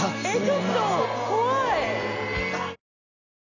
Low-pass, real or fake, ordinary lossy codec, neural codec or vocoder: 7.2 kHz; real; none; none